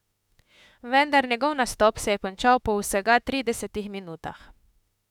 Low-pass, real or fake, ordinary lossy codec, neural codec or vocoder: 19.8 kHz; fake; none; autoencoder, 48 kHz, 32 numbers a frame, DAC-VAE, trained on Japanese speech